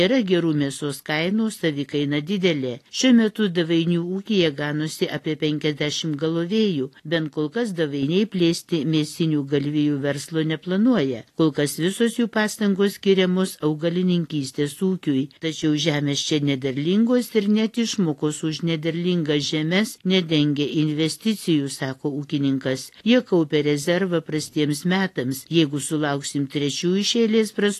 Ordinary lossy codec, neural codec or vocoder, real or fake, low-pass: AAC, 48 kbps; none; real; 14.4 kHz